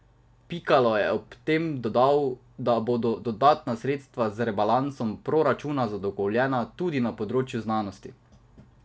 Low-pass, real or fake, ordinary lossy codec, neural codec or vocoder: none; real; none; none